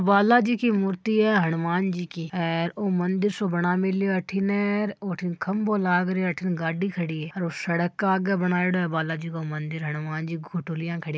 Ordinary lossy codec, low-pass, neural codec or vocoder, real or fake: none; none; none; real